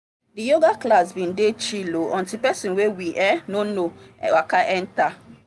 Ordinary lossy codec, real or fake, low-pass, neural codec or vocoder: none; real; none; none